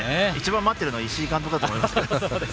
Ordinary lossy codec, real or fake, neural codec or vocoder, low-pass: none; real; none; none